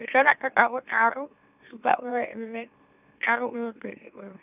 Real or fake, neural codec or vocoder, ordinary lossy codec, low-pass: fake; autoencoder, 44.1 kHz, a latent of 192 numbers a frame, MeloTTS; none; 3.6 kHz